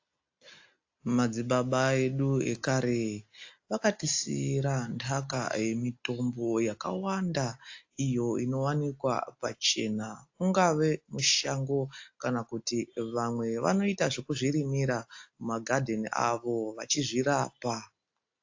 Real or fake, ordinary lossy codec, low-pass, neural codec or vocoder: real; AAC, 48 kbps; 7.2 kHz; none